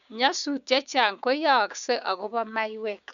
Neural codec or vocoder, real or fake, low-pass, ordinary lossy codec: codec, 16 kHz, 6 kbps, DAC; fake; 7.2 kHz; none